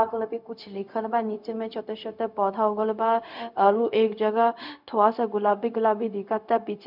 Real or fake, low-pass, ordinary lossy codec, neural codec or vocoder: fake; 5.4 kHz; none; codec, 16 kHz, 0.4 kbps, LongCat-Audio-Codec